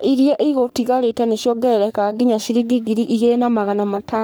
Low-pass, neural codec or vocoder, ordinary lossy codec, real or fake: none; codec, 44.1 kHz, 3.4 kbps, Pupu-Codec; none; fake